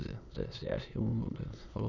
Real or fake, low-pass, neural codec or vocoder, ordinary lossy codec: fake; 7.2 kHz; autoencoder, 22.05 kHz, a latent of 192 numbers a frame, VITS, trained on many speakers; none